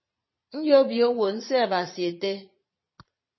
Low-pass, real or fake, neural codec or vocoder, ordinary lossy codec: 7.2 kHz; fake; vocoder, 22.05 kHz, 80 mel bands, Vocos; MP3, 24 kbps